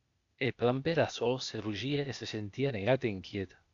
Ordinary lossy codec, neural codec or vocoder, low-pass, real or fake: AAC, 64 kbps; codec, 16 kHz, 0.8 kbps, ZipCodec; 7.2 kHz; fake